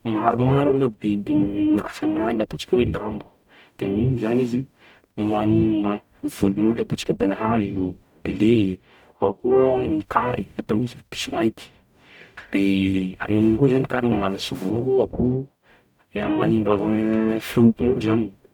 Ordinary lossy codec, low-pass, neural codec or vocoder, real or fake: none; 19.8 kHz; codec, 44.1 kHz, 0.9 kbps, DAC; fake